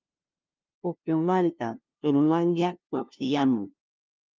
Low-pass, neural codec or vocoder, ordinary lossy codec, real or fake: 7.2 kHz; codec, 16 kHz, 0.5 kbps, FunCodec, trained on LibriTTS, 25 frames a second; Opus, 24 kbps; fake